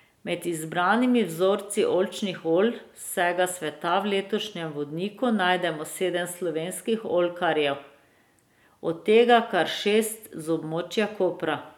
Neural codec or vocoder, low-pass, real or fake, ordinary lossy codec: none; 19.8 kHz; real; none